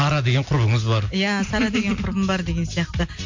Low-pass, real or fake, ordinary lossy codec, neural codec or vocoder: 7.2 kHz; real; MP3, 48 kbps; none